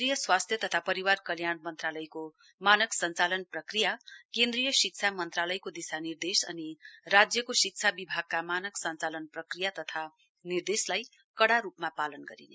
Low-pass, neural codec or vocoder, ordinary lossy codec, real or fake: none; none; none; real